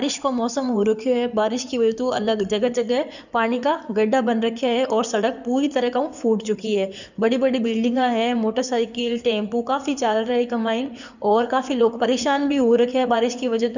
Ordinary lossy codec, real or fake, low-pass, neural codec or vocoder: none; fake; 7.2 kHz; codec, 16 kHz in and 24 kHz out, 2.2 kbps, FireRedTTS-2 codec